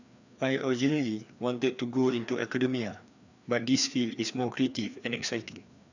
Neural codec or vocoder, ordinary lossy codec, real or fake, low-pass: codec, 16 kHz, 2 kbps, FreqCodec, larger model; none; fake; 7.2 kHz